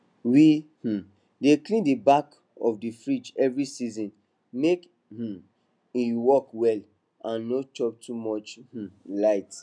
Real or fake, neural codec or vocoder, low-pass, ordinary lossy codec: real; none; 9.9 kHz; none